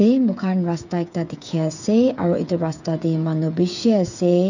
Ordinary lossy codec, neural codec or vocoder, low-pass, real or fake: none; codec, 16 kHz, 8 kbps, FreqCodec, smaller model; 7.2 kHz; fake